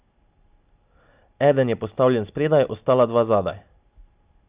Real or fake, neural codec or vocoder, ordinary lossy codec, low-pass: real; none; Opus, 64 kbps; 3.6 kHz